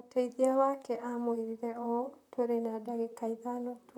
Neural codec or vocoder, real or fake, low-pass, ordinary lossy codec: vocoder, 44.1 kHz, 128 mel bands, Pupu-Vocoder; fake; 19.8 kHz; none